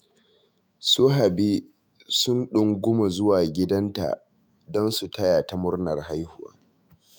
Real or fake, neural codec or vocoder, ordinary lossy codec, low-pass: fake; autoencoder, 48 kHz, 128 numbers a frame, DAC-VAE, trained on Japanese speech; none; none